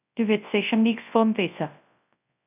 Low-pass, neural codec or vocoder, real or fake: 3.6 kHz; codec, 24 kHz, 0.9 kbps, WavTokenizer, large speech release; fake